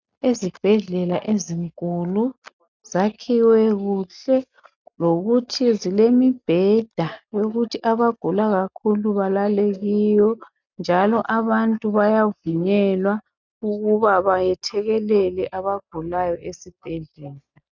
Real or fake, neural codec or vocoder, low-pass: real; none; 7.2 kHz